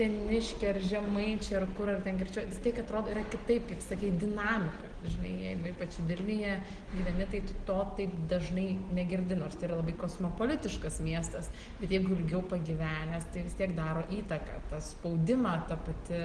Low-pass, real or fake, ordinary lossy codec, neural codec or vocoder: 9.9 kHz; real; Opus, 16 kbps; none